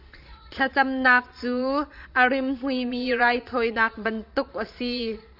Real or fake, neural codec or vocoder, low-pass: fake; vocoder, 22.05 kHz, 80 mel bands, Vocos; 5.4 kHz